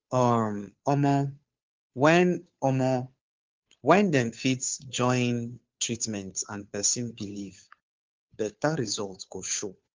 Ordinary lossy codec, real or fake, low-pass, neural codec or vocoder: Opus, 24 kbps; fake; 7.2 kHz; codec, 16 kHz, 2 kbps, FunCodec, trained on Chinese and English, 25 frames a second